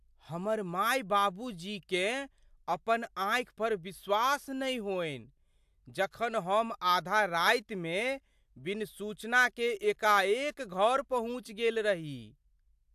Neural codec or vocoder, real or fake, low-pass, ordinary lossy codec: none; real; 14.4 kHz; AAC, 96 kbps